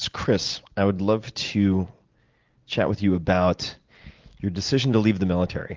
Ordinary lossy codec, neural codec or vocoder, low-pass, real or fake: Opus, 32 kbps; none; 7.2 kHz; real